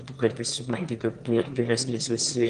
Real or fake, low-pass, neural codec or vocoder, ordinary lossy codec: fake; 9.9 kHz; autoencoder, 22.05 kHz, a latent of 192 numbers a frame, VITS, trained on one speaker; Opus, 24 kbps